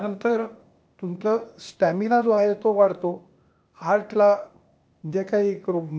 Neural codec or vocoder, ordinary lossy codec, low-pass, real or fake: codec, 16 kHz, 0.8 kbps, ZipCodec; none; none; fake